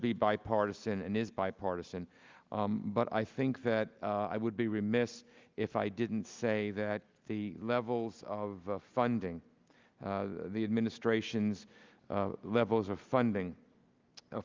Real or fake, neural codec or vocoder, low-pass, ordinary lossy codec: real; none; 7.2 kHz; Opus, 24 kbps